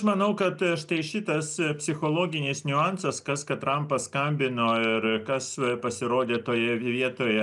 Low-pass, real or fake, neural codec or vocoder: 10.8 kHz; real; none